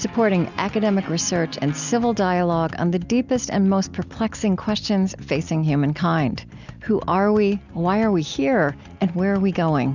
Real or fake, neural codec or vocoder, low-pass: real; none; 7.2 kHz